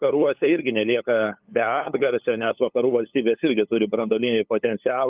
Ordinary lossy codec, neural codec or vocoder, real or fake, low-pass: Opus, 32 kbps; codec, 16 kHz, 8 kbps, FunCodec, trained on LibriTTS, 25 frames a second; fake; 3.6 kHz